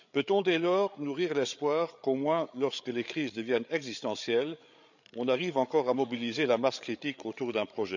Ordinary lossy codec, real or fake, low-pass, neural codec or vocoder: none; fake; 7.2 kHz; codec, 16 kHz, 8 kbps, FreqCodec, larger model